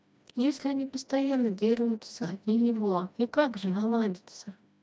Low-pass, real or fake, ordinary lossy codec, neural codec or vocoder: none; fake; none; codec, 16 kHz, 1 kbps, FreqCodec, smaller model